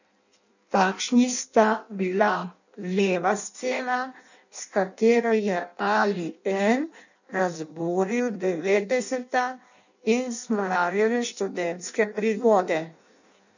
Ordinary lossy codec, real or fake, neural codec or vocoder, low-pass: none; fake; codec, 16 kHz in and 24 kHz out, 0.6 kbps, FireRedTTS-2 codec; 7.2 kHz